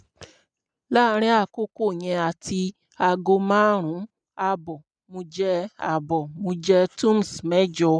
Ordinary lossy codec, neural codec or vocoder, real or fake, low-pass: none; none; real; 9.9 kHz